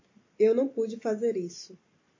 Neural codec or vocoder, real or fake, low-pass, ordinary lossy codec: none; real; 7.2 kHz; MP3, 32 kbps